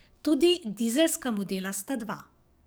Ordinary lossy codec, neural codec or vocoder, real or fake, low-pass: none; codec, 44.1 kHz, 7.8 kbps, DAC; fake; none